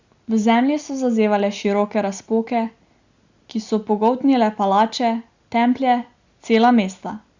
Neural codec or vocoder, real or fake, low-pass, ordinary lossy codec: none; real; 7.2 kHz; Opus, 64 kbps